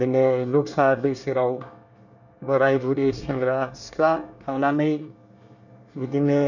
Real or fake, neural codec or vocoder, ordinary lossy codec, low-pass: fake; codec, 24 kHz, 1 kbps, SNAC; none; 7.2 kHz